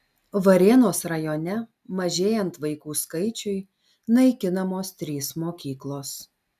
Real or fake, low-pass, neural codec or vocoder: real; 14.4 kHz; none